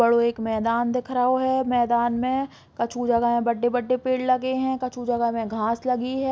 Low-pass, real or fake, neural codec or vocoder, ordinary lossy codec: none; real; none; none